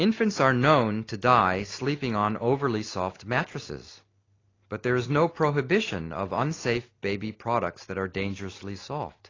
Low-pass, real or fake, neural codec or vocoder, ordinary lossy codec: 7.2 kHz; real; none; AAC, 32 kbps